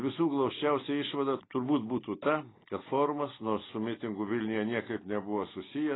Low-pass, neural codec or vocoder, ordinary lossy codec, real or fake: 7.2 kHz; none; AAC, 16 kbps; real